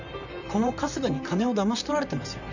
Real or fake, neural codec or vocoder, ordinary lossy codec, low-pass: fake; vocoder, 44.1 kHz, 128 mel bands, Pupu-Vocoder; none; 7.2 kHz